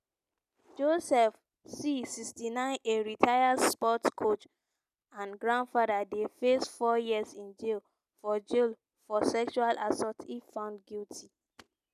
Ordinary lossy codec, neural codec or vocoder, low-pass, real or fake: none; none; 14.4 kHz; real